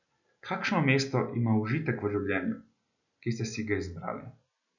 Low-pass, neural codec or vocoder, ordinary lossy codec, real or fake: 7.2 kHz; none; none; real